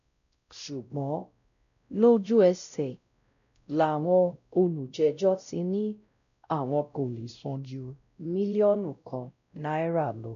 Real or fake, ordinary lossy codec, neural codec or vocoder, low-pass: fake; none; codec, 16 kHz, 0.5 kbps, X-Codec, WavLM features, trained on Multilingual LibriSpeech; 7.2 kHz